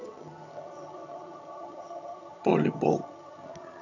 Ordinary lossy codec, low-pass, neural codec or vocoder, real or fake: none; 7.2 kHz; vocoder, 22.05 kHz, 80 mel bands, HiFi-GAN; fake